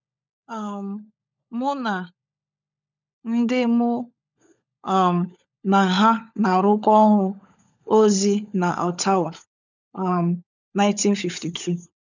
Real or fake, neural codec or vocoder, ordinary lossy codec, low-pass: fake; codec, 16 kHz, 16 kbps, FunCodec, trained on LibriTTS, 50 frames a second; none; 7.2 kHz